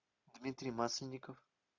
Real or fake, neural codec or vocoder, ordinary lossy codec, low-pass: real; none; MP3, 64 kbps; 7.2 kHz